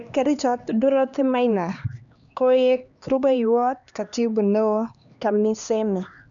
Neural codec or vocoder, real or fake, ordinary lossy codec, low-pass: codec, 16 kHz, 2 kbps, X-Codec, HuBERT features, trained on LibriSpeech; fake; none; 7.2 kHz